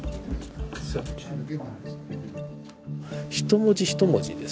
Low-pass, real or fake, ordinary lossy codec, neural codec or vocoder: none; real; none; none